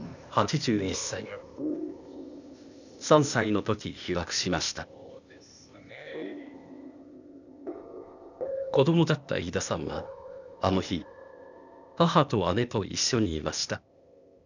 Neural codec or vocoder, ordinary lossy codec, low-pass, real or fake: codec, 16 kHz, 0.8 kbps, ZipCodec; none; 7.2 kHz; fake